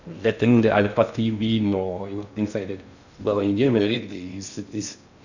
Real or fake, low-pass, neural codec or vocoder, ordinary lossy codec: fake; 7.2 kHz; codec, 16 kHz in and 24 kHz out, 0.6 kbps, FocalCodec, streaming, 2048 codes; none